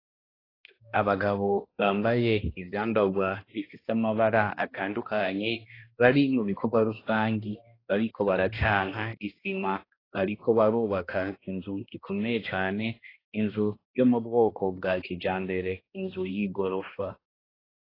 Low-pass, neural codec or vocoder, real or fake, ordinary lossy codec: 5.4 kHz; codec, 16 kHz, 1 kbps, X-Codec, HuBERT features, trained on balanced general audio; fake; AAC, 24 kbps